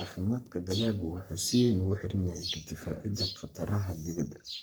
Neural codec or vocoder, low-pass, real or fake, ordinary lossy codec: codec, 44.1 kHz, 3.4 kbps, Pupu-Codec; none; fake; none